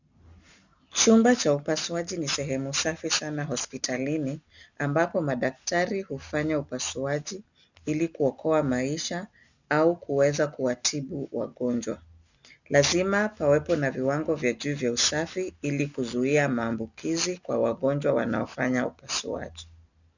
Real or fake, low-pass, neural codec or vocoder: real; 7.2 kHz; none